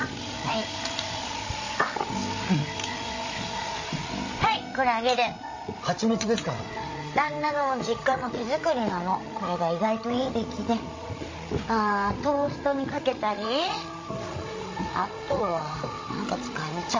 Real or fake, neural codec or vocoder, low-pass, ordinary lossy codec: fake; codec, 16 kHz, 16 kbps, FreqCodec, larger model; 7.2 kHz; MP3, 32 kbps